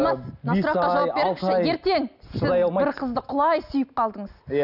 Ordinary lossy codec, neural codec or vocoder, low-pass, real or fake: none; none; 5.4 kHz; real